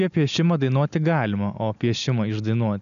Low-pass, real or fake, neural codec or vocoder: 7.2 kHz; real; none